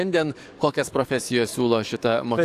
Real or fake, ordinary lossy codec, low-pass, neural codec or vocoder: fake; AAC, 64 kbps; 14.4 kHz; codec, 44.1 kHz, 7.8 kbps, DAC